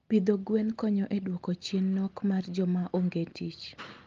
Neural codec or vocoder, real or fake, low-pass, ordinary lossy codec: none; real; 7.2 kHz; Opus, 32 kbps